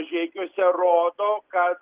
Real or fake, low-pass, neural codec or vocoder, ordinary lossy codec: real; 3.6 kHz; none; Opus, 32 kbps